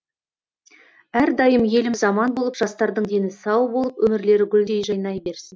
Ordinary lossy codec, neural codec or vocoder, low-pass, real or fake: none; none; none; real